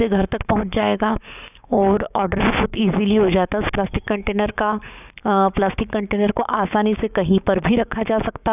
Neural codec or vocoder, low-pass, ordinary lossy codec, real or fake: codec, 16 kHz, 6 kbps, DAC; 3.6 kHz; none; fake